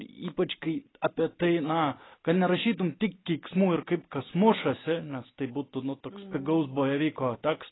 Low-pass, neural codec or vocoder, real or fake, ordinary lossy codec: 7.2 kHz; none; real; AAC, 16 kbps